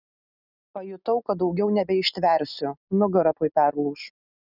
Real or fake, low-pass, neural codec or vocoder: real; 5.4 kHz; none